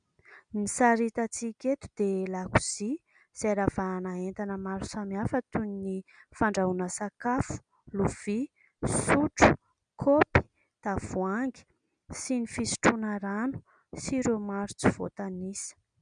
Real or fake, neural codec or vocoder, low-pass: real; none; 9.9 kHz